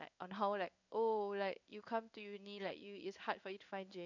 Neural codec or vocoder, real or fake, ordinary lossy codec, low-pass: codec, 16 kHz in and 24 kHz out, 1 kbps, XY-Tokenizer; fake; none; 7.2 kHz